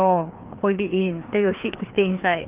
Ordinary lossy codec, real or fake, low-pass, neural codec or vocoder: Opus, 32 kbps; fake; 3.6 kHz; codec, 16 kHz, 2 kbps, FreqCodec, larger model